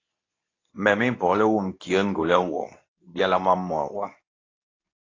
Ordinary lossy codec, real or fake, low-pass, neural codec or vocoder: AAC, 32 kbps; fake; 7.2 kHz; codec, 24 kHz, 0.9 kbps, WavTokenizer, medium speech release version 2